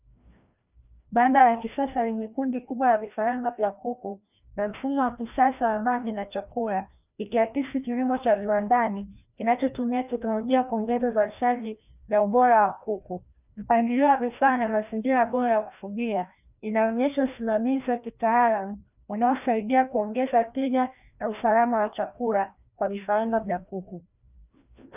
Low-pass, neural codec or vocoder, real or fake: 3.6 kHz; codec, 16 kHz, 1 kbps, FreqCodec, larger model; fake